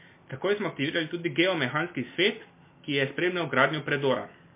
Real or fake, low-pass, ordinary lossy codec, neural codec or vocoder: real; 3.6 kHz; MP3, 24 kbps; none